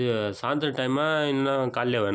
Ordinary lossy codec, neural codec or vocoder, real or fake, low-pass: none; none; real; none